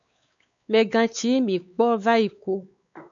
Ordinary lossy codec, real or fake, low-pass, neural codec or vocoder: MP3, 64 kbps; fake; 7.2 kHz; codec, 16 kHz, 4 kbps, X-Codec, WavLM features, trained on Multilingual LibriSpeech